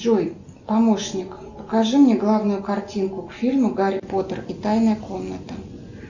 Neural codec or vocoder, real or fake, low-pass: none; real; 7.2 kHz